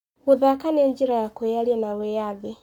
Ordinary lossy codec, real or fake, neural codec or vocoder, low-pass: none; fake; codec, 44.1 kHz, 7.8 kbps, Pupu-Codec; 19.8 kHz